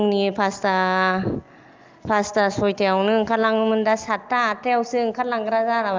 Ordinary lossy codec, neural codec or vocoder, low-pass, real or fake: Opus, 24 kbps; none; 7.2 kHz; real